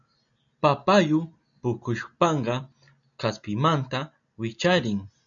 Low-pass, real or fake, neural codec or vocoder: 7.2 kHz; real; none